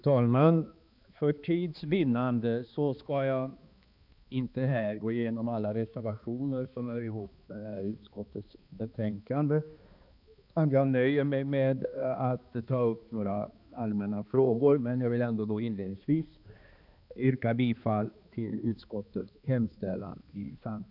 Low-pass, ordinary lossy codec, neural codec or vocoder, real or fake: 5.4 kHz; none; codec, 16 kHz, 2 kbps, X-Codec, HuBERT features, trained on balanced general audio; fake